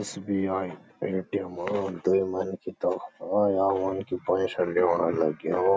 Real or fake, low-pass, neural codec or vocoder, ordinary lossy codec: real; none; none; none